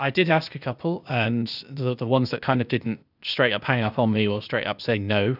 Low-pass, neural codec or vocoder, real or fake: 5.4 kHz; codec, 16 kHz, 0.8 kbps, ZipCodec; fake